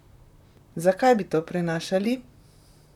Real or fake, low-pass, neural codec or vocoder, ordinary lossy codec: fake; 19.8 kHz; vocoder, 44.1 kHz, 128 mel bands, Pupu-Vocoder; none